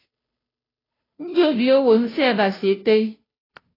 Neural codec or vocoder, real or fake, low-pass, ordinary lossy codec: codec, 16 kHz, 0.5 kbps, FunCodec, trained on Chinese and English, 25 frames a second; fake; 5.4 kHz; AAC, 24 kbps